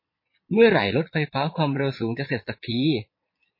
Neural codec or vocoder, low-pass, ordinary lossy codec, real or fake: vocoder, 22.05 kHz, 80 mel bands, Vocos; 5.4 kHz; MP3, 24 kbps; fake